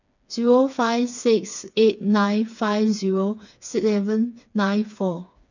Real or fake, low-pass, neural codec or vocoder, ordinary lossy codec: fake; 7.2 kHz; codec, 16 kHz, 4 kbps, FreqCodec, smaller model; none